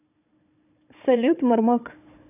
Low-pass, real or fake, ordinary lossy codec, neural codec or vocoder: 3.6 kHz; fake; none; codec, 16 kHz, 4 kbps, FunCodec, trained on Chinese and English, 50 frames a second